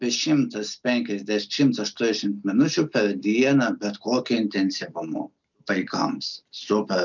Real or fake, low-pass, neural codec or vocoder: real; 7.2 kHz; none